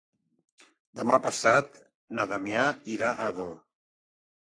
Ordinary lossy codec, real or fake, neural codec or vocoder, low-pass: AAC, 64 kbps; fake; codec, 44.1 kHz, 3.4 kbps, Pupu-Codec; 9.9 kHz